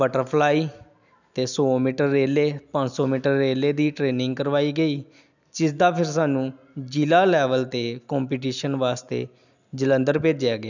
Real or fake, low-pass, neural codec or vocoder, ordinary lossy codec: real; 7.2 kHz; none; none